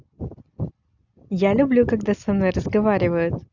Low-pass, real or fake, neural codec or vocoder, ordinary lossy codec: 7.2 kHz; real; none; none